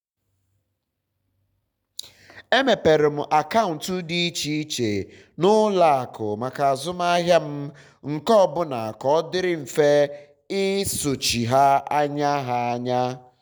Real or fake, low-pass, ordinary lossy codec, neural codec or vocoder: real; none; none; none